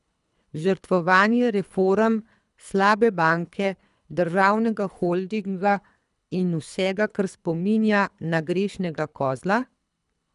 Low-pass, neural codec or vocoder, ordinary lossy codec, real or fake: 10.8 kHz; codec, 24 kHz, 3 kbps, HILCodec; none; fake